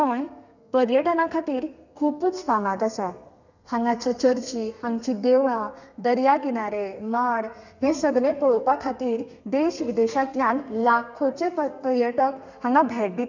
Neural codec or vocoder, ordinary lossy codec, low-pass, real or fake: codec, 32 kHz, 1.9 kbps, SNAC; none; 7.2 kHz; fake